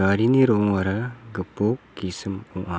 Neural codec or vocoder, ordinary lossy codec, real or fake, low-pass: none; none; real; none